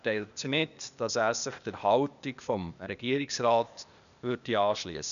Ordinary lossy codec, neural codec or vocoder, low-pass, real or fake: none; codec, 16 kHz, 0.8 kbps, ZipCodec; 7.2 kHz; fake